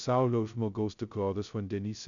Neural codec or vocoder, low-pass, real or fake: codec, 16 kHz, 0.2 kbps, FocalCodec; 7.2 kHz; fake